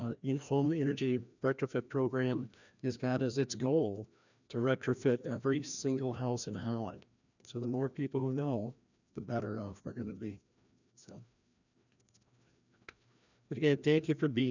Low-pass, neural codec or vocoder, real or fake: 7.2 kHz; codec, 16 kHz, 1 kbps, FreqCodec, larger model; fake